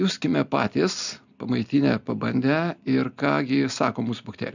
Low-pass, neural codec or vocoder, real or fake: 7.2 kHz; none; real